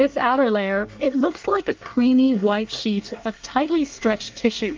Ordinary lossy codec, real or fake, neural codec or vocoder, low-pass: Opus, 24 kbps; fake; codec, 24 kHz, 1 kbps, SNAC; 7.2 kHz